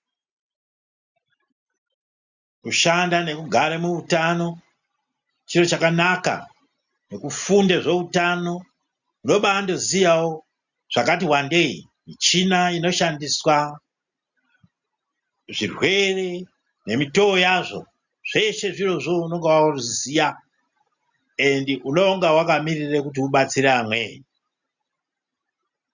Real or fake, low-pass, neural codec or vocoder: real; 7.2 kHz; none